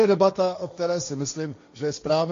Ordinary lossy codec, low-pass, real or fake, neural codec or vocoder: MP3, 48 kbps; 7.2 kHz; fake; codec, 16 kHz, 1.1 kbps, Voila-Tokenizer